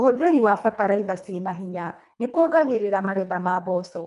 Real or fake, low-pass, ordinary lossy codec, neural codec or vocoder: fake; 10.8 kHz; none; codec, 24 kHz, 1.5 kbps, HILCodec